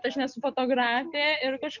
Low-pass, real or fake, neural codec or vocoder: 7.2 kHz; real; none